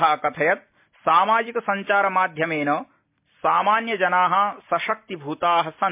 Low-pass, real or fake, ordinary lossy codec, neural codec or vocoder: 3.6 kHz; real; MP3, 32 kbps; none